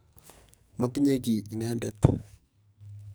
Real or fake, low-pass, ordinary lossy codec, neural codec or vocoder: fake; none; none; codec, 44.1 kHz, 2.6 kbps, SNAC